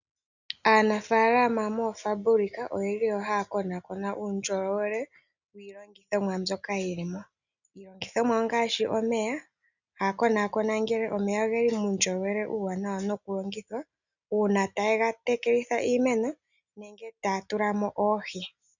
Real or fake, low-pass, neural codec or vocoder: real; 7.2 kHz; none